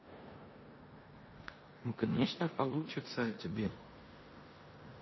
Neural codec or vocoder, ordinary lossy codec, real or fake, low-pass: codec, 16 kHz in and 24 kHz out, 0.9 kbps, LongCat-Audio-Codec, four codebook decoder; MP3, 24 kbps; fake; 7.2 kHz